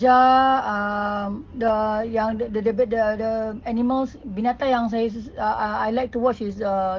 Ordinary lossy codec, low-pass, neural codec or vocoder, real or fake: Opus, 16 kbps; 7.2 kHz; none; real